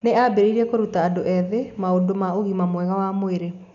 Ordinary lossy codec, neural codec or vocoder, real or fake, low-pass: none; none; real; 7.2 kHz